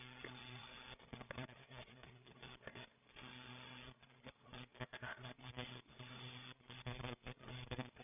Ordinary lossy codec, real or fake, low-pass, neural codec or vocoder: none; fake; 3.6 kHz; codec, 16 kHz, 8 kbps, FreqCodec, larger model